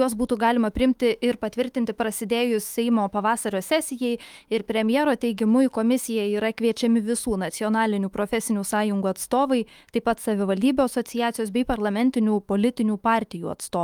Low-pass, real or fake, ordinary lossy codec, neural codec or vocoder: 19.8 kHz; fake; Opus, 32 kbps; autoencoder, 48 kHz, 128 numbers a frame, DAC-VAE, trained on Japanese speech